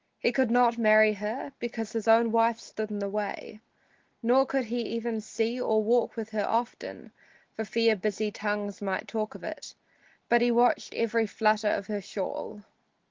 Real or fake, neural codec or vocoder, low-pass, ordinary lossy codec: real; none; 7.2 kHz; Opus, 16 kbps